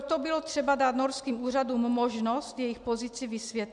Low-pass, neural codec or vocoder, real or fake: 10.8 kHz; none; real